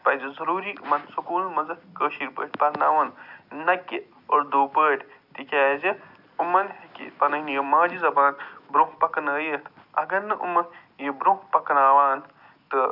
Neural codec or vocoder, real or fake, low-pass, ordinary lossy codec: none; real; 5.4 kHz; none